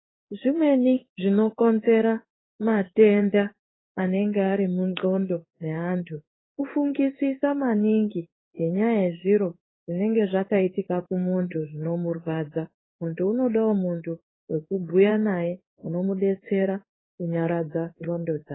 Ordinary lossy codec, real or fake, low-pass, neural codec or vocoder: AAC, 16 kbps; fake; 7.2 kHz; codec, 16 kHz in and 24 kHz out, 1 kbps, XY-Tokenizer